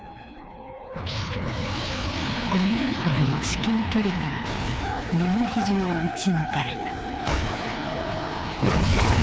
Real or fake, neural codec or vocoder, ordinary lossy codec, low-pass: fake; codec, 16 kHz, 2 kbps, FreqCodec, larger model; none; none